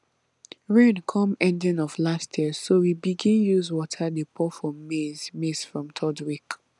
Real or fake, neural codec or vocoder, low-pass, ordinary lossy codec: real; none; 10.8 kHz; none